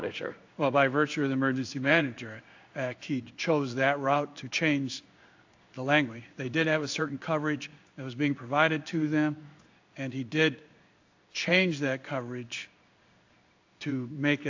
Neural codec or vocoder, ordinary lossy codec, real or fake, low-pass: codec, 16 kHz in and 24 kHz out, 1 kbps, XY-Tokenizer; AAC, 48 kbps; fake; 7.2 kHz